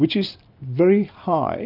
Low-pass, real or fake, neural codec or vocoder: 5.4 kHz; real; none